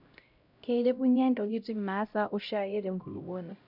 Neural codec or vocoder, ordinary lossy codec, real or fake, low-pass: codec, 16 kHz, 0.5 kbps, X-Codec, HuBERT features, trained on LibriSpeech; none; fake; 5.4 kHz